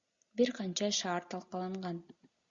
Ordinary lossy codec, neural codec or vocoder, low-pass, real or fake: Opus, 64 kbps; none; 7.2 kHz; real